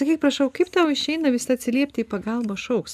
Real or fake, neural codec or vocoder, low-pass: real; none; 14.4 kHz